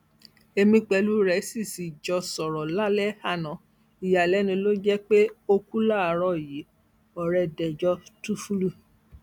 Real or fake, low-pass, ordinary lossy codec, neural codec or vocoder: real; 19.8 kHz; none; none